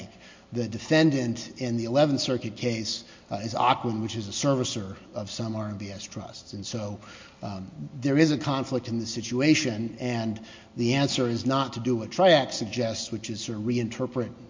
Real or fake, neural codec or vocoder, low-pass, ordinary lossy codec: real; none; 7.2 kHz; MP3, 48 kbps